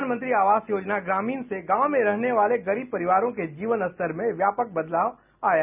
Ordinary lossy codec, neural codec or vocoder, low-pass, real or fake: none; none; 3.6 kHz; real